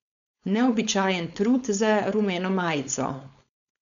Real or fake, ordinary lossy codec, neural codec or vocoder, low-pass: fake; none; codec, 16 kHz, 4.8 kbps, FACodec; 7.2 kHz